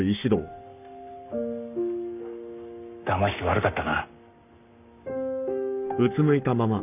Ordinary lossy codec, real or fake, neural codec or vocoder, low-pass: none; fake; codec, 44.1 kHz, 7.8 kbps, Pupu-Codec; 3.6 kHz